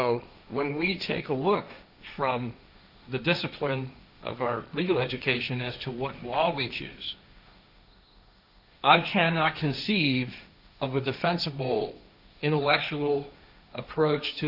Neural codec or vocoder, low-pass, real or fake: codec, 16 kHz, 1.1 kbps, Voila-Tokenizer; 5.4 kHz; fake